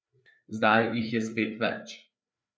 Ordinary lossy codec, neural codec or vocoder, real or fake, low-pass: none; codec, 16 kHz, 4 kbps, FreqCodec, larger model; fake; none